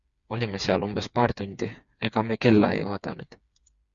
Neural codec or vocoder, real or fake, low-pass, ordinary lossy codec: codec, 16 kHz, 4 kbps, FreqCodec, smaller model; fake; 7.2 kHz; Opus, 64 kbps